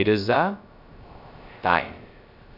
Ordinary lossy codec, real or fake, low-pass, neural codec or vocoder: none; fake; 5.4 kHz; codec, 16 kHz, 0.3 kbps, FocalCodec